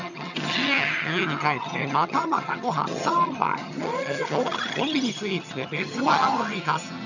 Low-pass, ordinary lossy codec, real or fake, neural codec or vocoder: 7.2 kHz; none; fake; vocoder, 22.05 kHz, 80 mel bands, HiFi-GAN